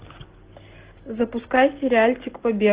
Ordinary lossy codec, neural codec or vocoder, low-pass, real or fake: Opus, 16 kbps; none; 3.6 kHz; real